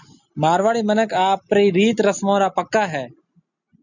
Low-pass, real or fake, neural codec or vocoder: 7.2 kHz; real; none